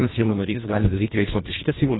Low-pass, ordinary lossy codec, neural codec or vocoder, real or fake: 7.2 kHz; AAC, 16 kbps; codec, 24 kHz, 1.5 kbps, HILCodec; fake